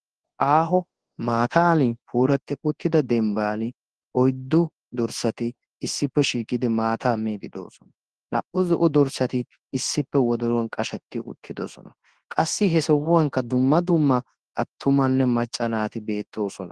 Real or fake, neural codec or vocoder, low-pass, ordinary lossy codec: fake; codec, 24 kHz, 0.9 kbps, WavTokenizer, large speech release; 10.8 kHz; Opus, 16 kbps